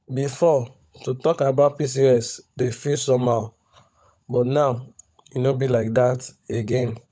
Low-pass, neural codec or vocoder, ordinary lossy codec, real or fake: none; codec, 16 kHz, 16 kbps, FunCodec, trained on LibriTTS, 50 frames a second; none; fake